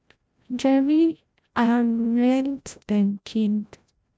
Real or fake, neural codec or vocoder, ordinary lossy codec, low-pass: fake; codec, 16 kHz, 0.5 kbps, FreqCodec, larger model; none; none